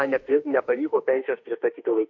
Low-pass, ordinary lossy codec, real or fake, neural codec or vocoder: 7.2 kHz; MP3, 48 kbps; fake; autoencoder, 48 kHz, 32 numbers a frame, DAC-VAE, trained on Japanese speech